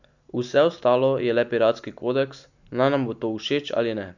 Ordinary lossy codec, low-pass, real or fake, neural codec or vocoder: none; 7.2 kHz; real; none